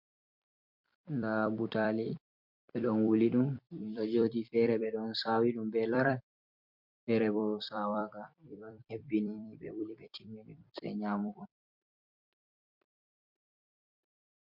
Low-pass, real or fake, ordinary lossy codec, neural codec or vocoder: 5.4 kHz; fake; MP3, 48 kbps; vocoder, 44.1 kHz, 128 mel bands every 256 samples, BigVGAN v2